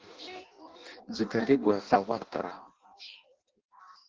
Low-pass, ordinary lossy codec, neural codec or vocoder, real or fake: 7.2 kHz; Opus, 16 kbps; codec, 16 kHz in and 24 kHz out, 0.6 kbps, FireRedTTS-2 codec; fake